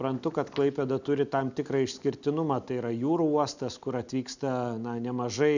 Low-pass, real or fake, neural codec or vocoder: 7.2 kHz; real; none